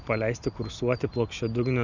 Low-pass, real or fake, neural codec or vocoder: 7.2 kHz; real; none